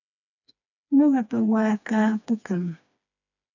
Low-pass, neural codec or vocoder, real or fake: 7.2 kHz; codec, 16 kHz, 2 kbps, FreqCodec, smaller model; fake